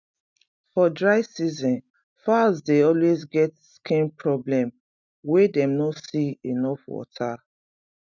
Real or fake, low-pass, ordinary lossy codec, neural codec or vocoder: real; 7.2 kHz; none; none